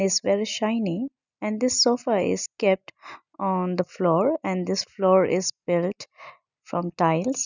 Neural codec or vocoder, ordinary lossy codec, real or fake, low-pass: none; none; real; 7.2 kHz